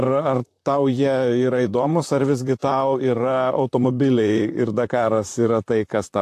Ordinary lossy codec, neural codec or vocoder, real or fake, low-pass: AAC, 64 kbps; vocoder, 44.1 kHz, 128 mel bands, Pupu-Vocoder; fake; 14.4 kHz